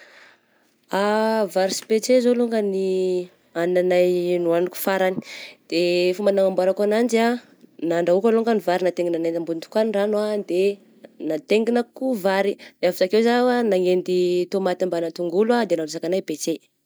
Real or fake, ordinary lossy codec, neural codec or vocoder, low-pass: real; none; none; none